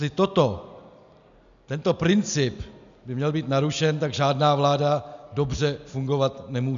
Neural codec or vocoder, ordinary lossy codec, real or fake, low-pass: none; MP3, 96 kbps; real; 7.2 kHz